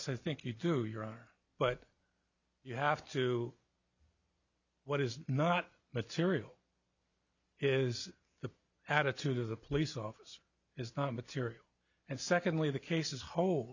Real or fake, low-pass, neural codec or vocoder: real; 7.2 kHz; none